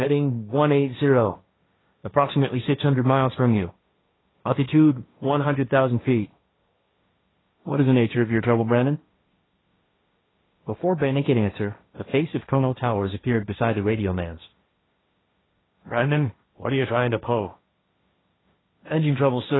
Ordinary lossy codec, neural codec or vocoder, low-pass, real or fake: AAC, 16 kbps; codec, 16 kHz, 1.1 kbps, Voila-Tokenizer; 7.2 kHz; fake